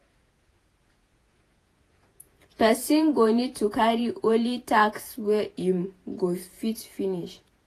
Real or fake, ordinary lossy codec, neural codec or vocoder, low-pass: fake; AAC, 48 kbps; vocoder, 48 kHz, 128 mel bands, Vocos; 14.4 kHz